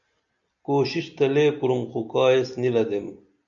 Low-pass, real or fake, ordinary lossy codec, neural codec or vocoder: 7.2 kHz; real; MP3, 96 kbps; none